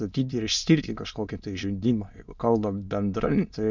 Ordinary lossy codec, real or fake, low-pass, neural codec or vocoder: MP3, 64 kbps; fake; 7.2 kHz; autoencoder, 22.05 kHz, a latent of 192 numbers a frame, VITS, trained on many speakers